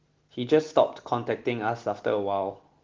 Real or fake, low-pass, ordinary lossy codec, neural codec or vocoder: real; 7.2 kHz; Opus, 16 kbps; none